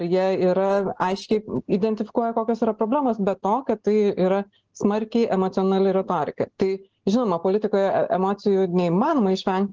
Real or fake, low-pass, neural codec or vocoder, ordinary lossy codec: real; 7.2 kHz; none; Opus, 16 kbps